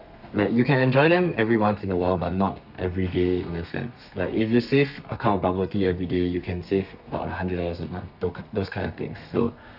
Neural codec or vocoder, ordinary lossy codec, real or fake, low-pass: codec, 32 kHz, 1.9 kbps, SNAC; none; fake; 5.4 kHz